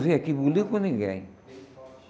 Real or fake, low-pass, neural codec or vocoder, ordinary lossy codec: real; none; none; none